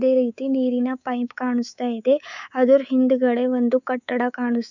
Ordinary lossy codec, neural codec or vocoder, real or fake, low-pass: none; codec, 24 kHz, 3.1 kbps, DualCodec; fake; 7.2 kHz